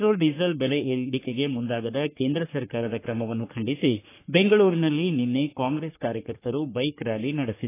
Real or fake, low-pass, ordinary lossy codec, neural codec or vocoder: fake; 3.6 kHz; AAC, 24 kbps; codec, 44.1 kHz, 3.4 kbps, Pupu-Codec